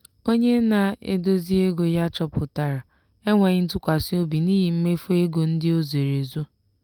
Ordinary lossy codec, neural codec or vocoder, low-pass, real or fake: Opus, 32 kbps; none; 19.8 kHz; real